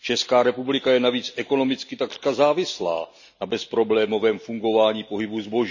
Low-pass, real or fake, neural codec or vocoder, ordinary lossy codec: 7.2 kHz; real; none; none